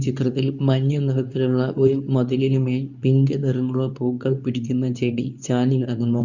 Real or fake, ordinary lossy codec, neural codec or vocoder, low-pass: fake; none; codec, 24 kHz, 0.9 kbps, WavTokenizer, medium speech release version 2; 7.2 kHz